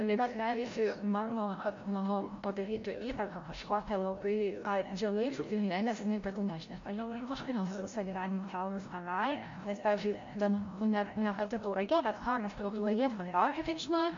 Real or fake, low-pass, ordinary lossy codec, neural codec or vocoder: fake; 7.2 kHz; MP3, 48 kbps; codec, 16 kHz, 0.5 kbps, FreqCodec, larger model